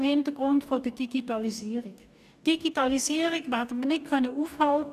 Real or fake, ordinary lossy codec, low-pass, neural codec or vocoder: fake; none; 14.4 kHz; codec, 44.1 kHz, 2.6 kbps, DAC